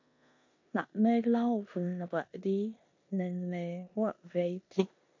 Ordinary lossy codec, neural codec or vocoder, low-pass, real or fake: MP3, 48 kbps; codec, 16 kHz in and 24 kHz out, 0.9 kbps, LongCat-Audio-Codec, four codebook decoder; 7.2 kHz; fake